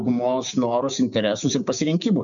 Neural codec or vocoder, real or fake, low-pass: codec, 16 kHz, 6 kbps, DAC; fake; 7.2 kHz